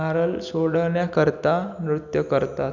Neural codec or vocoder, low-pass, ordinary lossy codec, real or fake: none; 7.2 kHz; none; real